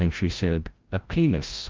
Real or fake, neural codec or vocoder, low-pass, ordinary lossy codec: fake; codec, 16 kHz, 0.5 kbps, FreqCodec, larger model; 7.2 kHz; Opus, 24 kbps